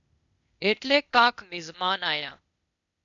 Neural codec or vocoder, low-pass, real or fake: codec, 16 kHz, 0.8 kbps, ZipCodec; 7.2 kHz; fake